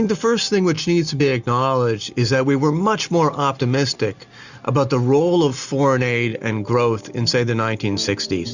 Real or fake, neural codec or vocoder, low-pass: real; none; 7.2 kHz